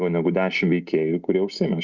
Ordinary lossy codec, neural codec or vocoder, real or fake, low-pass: Opus, 64 kbps; none; real; 7.2 kHz